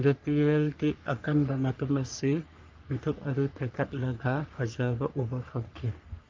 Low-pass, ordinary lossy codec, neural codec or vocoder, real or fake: 7.2 kHz; Opus, 24 kbps; codec, 44.1 kHz, 3.4 kbps, Pupu-Codec; fake